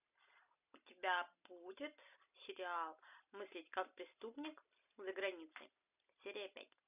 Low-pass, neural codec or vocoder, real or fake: 3.6 kHz; none; real